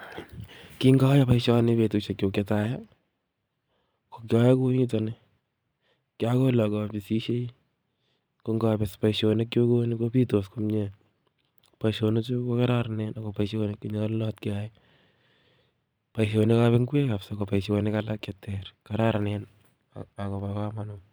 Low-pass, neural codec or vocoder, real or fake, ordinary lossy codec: none; vocoder, 44.1 kHz, 128 mel bands every 512 samples, BigVGAN v2; fake; none